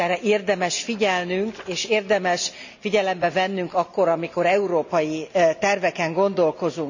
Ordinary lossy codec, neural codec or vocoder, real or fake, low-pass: none; none; real; 7.2 kHz